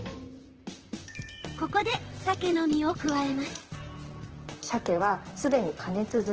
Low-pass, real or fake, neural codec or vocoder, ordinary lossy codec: 7.2 kHz; fake; vocoder, 44.1 kHz, 128 mel bands, Pupu-Vocoder; Opus, 16 kbps